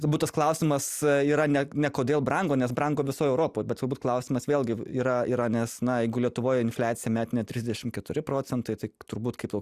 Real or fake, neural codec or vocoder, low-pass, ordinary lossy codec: real; none; 14.4 kHz; Opus, 64 kbps